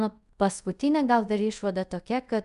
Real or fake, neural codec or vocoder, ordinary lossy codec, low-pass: fake; codec, 24 kHz, 0.5 kbps, DualCodec; MP3, 96 kbps; 10.8 kHz